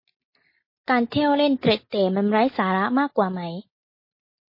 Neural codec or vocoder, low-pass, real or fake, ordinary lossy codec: none; 5.4 kHz; real; MP3, 24 kbps